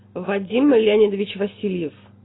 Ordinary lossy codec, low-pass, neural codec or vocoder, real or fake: AAC, 16 kbps; 7.2 kHz; none; real